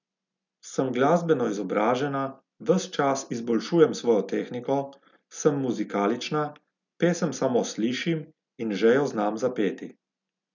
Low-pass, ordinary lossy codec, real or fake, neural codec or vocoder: 7.2 kHz; none; real; none